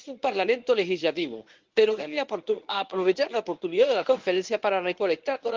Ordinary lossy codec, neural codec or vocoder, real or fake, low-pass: Opus, 24 kbps; codec, 24 kHz, 0.9 kbps, WavTokenizer, medium speech release version 1; fake; 7.2 kHz